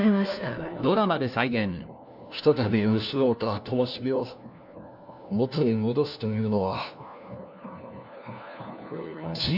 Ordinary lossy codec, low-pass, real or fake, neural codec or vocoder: none; 5.4 kHz; fake; codec, 16 kHz, 1 kbps, FunCodec, trained on LibriTTS, 50 frames a second